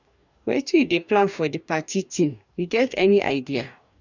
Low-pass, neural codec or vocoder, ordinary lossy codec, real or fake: 7.2 kHz; codec, 44.1 kHz, 2.6 kbps, DAC; none; fake